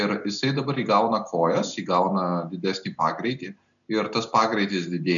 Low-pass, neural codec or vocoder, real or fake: 7.2 kHz; none; real